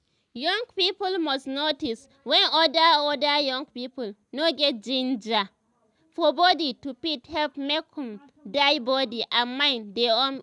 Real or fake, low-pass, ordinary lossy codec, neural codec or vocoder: real; 10.8 kHz; none; none